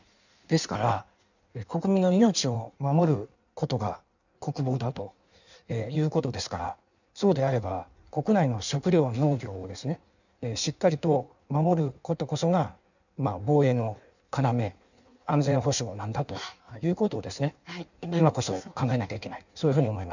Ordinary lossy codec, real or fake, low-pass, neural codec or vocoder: none; fake; 7.2 kHz; codec, 16 kHz in and 24 kHz out, 1.1 kbps, FireRedTTS-2 codec